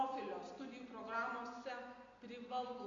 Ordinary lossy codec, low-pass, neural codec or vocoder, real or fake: MP3, 48 kbps; 7.2 kHz; none; real